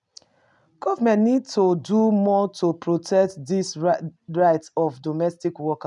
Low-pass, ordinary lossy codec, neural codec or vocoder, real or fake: 10.8 kHz; none; none; real